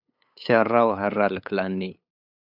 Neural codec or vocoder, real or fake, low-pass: codec, 16 kHz, 8 kbps, FunCodec, trained on LibriTTS, 25 frames a second; fake; 5.4 kHz